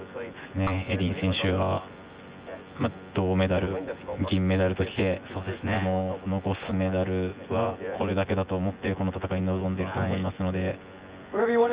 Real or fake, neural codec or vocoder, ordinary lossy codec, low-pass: fake; vocoder, 24 kHz, 100 mel bands, Vocos; Opus, 24 kbps; 3.6 kHz